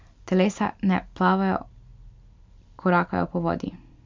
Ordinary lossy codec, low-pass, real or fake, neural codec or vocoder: MP3, 64 kbps; 7.2 kHz; real; none